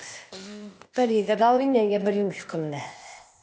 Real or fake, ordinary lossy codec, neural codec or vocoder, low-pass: fake; none; codec, 16 kHz, 0.8 kbps, ZipCodec; none